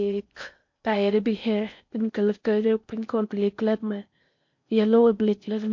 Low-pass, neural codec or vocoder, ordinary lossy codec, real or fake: 7.2 kHz; codec, 16 kHz in and 24 kHz out, 0.6 kbps, FocalCodec, streaming, 4096 codes; MP3, 48 kbps; fake